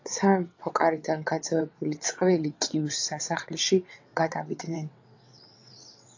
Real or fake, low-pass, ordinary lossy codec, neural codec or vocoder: fake; 7.2 kHz; AAC, 48 kbps; vocoder, 22.05 kHz, 80 mel bands, WaveNeXt